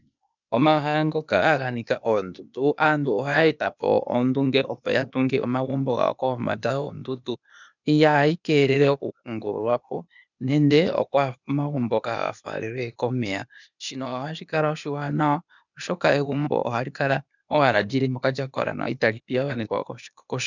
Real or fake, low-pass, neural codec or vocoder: fake; 7.2 kHz; codec, 16 kHz, 0.8 kbps, ZipCodec